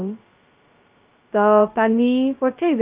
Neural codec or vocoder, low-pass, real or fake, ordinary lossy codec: codec, 16 kHz, 0.2 kbps, FocalCodec; 3.6 kHz; fake; Opus, 32 kbps